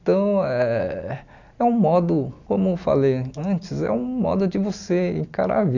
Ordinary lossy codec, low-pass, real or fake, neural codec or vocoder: none; 7.2 kHz; real; none